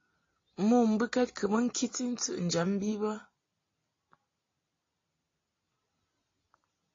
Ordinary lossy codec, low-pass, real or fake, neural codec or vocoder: AAC, 32 kbps; 7.2 kHz; real; none